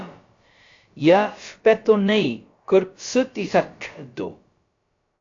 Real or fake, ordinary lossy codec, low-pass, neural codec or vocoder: fake; AAC, 48 kbps; 7.2 kHz; codec, 16 kHz, about 1 kbps, DyCAST, with the encoder's durations